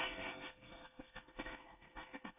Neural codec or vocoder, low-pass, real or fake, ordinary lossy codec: codec, 24 kHz, 1 kbps, SNAC; 3.6 kHz; fake; none